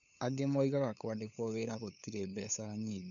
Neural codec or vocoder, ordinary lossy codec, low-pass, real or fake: codec, 16 kHz, 8 kbps, FunCodec, trained on LibriTTS, 25 frames a second; MP3, 96 kbps; 7.2 kHz; fake